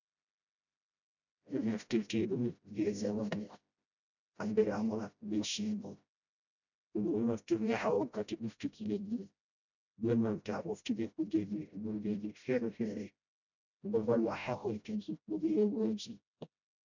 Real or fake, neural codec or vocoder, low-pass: fake; codec, 16 kHz, 0.5 kbps, FreqCodec, smaller model; 7.2 kHz